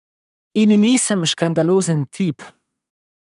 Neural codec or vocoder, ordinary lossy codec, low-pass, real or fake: codec, 24 kHz, 1 kbps, SNAC; none; 10.8 kHz; fake